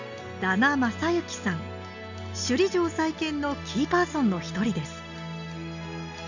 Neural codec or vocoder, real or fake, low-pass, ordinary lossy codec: none; real; 7.2 kHz; none